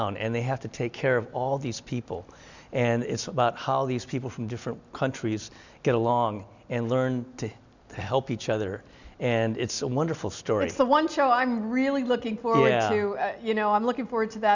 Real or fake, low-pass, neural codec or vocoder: real; 7.2 kHz; none